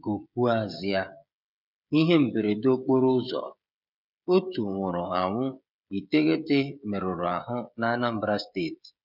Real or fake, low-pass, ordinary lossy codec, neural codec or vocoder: fake; 5.4 kHz; none; codec, 16 kHz, 16 kbps, FreqCodec, smaller model